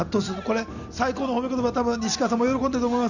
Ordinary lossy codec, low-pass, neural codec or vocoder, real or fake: none; 7.2 kHz; none; real